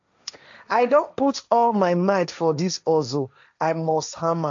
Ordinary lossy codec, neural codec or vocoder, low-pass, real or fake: MP3, 64 kbps; codec, 16 kHz, 1.1 kbps, Voila-Tokenizer; 7.2 kHz; fake